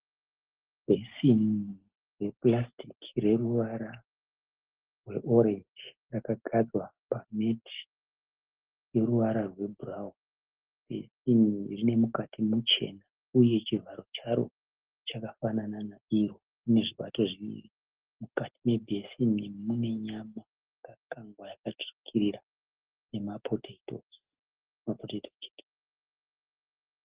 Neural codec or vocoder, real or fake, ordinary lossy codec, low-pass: none; real; Opus, 16 kbps; 3.6 kHz